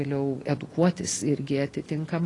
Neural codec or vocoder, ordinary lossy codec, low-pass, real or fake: none; AAC, 32 kbps; 10.8 kHz; real